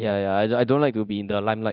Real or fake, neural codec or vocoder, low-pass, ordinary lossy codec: fake; codec, 24 kHz, 0.9 kbps, DualCodec; 5.4 kHz; none